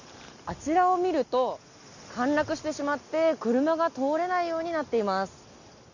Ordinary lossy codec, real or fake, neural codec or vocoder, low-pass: none; real; none; 7.2 kHz